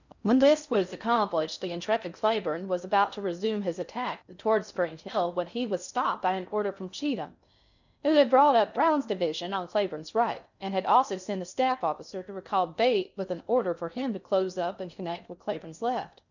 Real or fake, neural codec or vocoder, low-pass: fake; codec, 16 kHz in and 24 kHz out, 0.6 kbps, FocalCodec, streaming, 4096 codes; 7.2 kHz